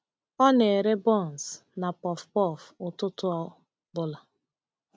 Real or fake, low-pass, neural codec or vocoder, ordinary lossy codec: real; none; none; none